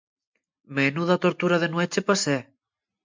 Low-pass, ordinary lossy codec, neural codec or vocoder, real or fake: 7.2 kHz; MP3, 64 kbps; none; real